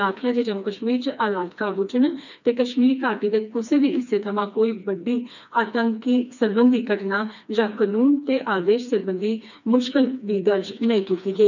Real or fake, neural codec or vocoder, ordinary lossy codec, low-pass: fake; codec, 16 kHz, 2 kbps, FreqCodec, smaller model; none; 7.2 kHz